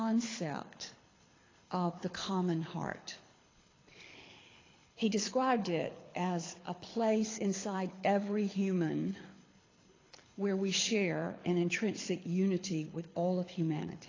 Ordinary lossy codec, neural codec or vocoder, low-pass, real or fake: AAC, 32 kbps; codec, 24 kHz, 6 kbps, HILCodec; 7.2 kHz; fake